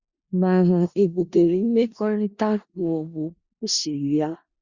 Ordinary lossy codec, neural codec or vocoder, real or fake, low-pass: Opus, 64 kbps; codec, 16 kHz in and 24 kHz out, 0.4 kbps, LongCat-Audio-Codec, four codebook decoder; fake; 7.2 kHz